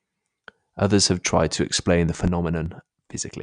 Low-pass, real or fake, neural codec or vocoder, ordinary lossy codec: 9.9 kHz; real; none; none